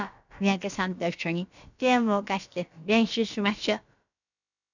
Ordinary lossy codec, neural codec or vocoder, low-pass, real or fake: none; codec, 16 kHz, about 1 kbps, DyCAST, with the encoder's durations; 7.2 kHz; fake